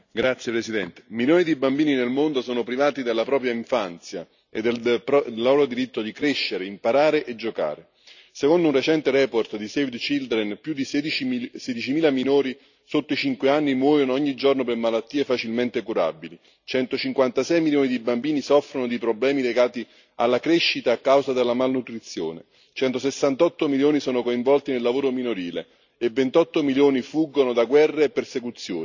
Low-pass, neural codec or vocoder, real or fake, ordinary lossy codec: 7.2 kHz; none; real; none